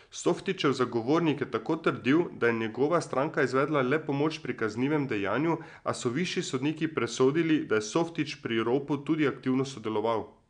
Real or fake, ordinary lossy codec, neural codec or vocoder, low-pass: real; none; none; 9.9 kHz